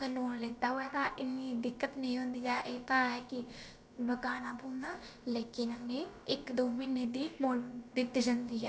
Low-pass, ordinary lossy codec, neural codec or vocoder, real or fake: none; none; codec, 16 kHz, about 1 kbps, DyCAST, with the encoder's durations; fake